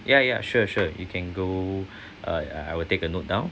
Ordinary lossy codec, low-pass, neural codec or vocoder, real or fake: none; none; none; real